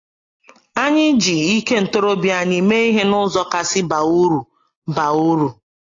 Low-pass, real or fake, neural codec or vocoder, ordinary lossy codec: 7.2 kHz; real; none; AAC, 32 kbps